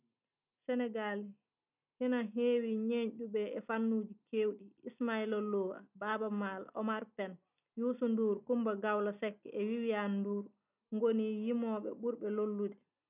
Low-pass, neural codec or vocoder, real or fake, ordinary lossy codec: 3.6 kHz; none; real; none